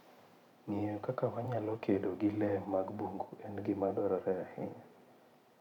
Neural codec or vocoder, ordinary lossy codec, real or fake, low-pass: vocoder, 44.1 kHz, 128 mel bands every 512 samples, BigVGAN v2; none; fake; 19.8 kHz